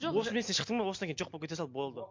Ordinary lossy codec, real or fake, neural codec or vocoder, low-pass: MP3, 48 kbps; real; none; 7.2 kHz